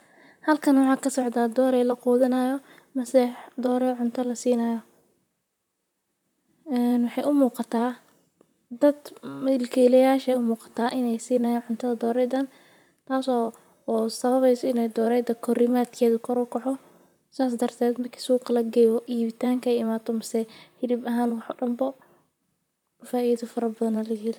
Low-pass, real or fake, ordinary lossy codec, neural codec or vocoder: 19.8 kHz; fake; none; vocoder, 44.1 kHz, 128 mel bands, Pupu-Vocoder